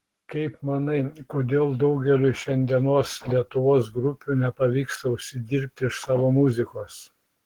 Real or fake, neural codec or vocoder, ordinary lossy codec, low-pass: fake; codec, 44.1 kHz, 7.8 kbps, Pupu-Codec; Opus, 16 kbps; 19.8 kHz